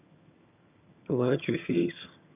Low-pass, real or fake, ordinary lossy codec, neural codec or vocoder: 3.6 kHz; fake; none; vocoder, 22.05 kHz, 80 mel bands, HiFi-GAN